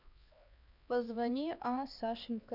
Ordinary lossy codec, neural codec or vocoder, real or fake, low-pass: none; codec, 16 kHz, 2 kbps, X-Codec, HuBERT features, trained on LibriSpeech; fake; 5.4 kHz